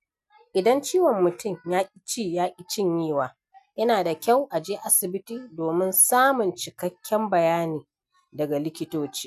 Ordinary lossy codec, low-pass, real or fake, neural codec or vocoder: none; 14.4 kHz; real; none